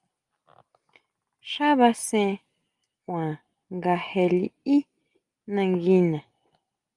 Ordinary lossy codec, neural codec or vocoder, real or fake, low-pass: Opus, 32 kbps; none; real; 10.8 kHz